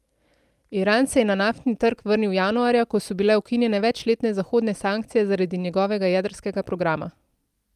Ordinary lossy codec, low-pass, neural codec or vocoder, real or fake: Opus, 32 kbps; 14.4 kHz; none; real